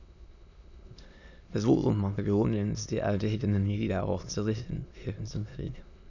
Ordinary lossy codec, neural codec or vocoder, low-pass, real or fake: none; autoencoder, 22.05 kHz, a latent of 192 numbers a frame, VITS, trained on many speakers; 7.2 kHz; fake